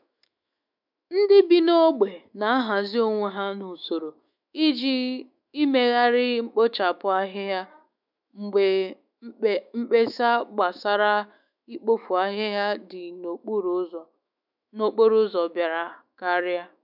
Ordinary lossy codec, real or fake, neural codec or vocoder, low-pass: none; fake; autoencoder, 48 kHz, 128 numbers a frame, DAC-VAE, trained on Japanese speech; 5.4 kHz